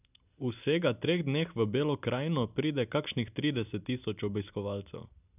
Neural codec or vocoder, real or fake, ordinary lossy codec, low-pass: none; real; none; 3.6 kHz